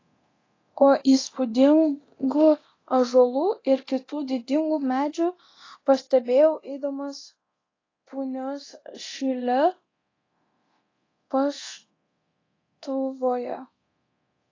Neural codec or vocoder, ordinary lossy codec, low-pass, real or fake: codec, 24 kHz, 0.9 kbps, DualCodec; AAC, 32 kbps; 7.2 kHz; fake